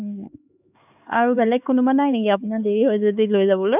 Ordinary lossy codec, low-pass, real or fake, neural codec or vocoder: none; 3.6 kHz; fake; codec, 16 kHz, 4 kbps, X-Codec, HuBERT features, trained on LibriSpeech